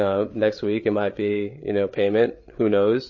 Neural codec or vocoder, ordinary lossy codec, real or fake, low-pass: codec, 16 kHz, 8 kbps, FreqCodec, larger model; MP3, 32 kbps; fake; 7.2 kHz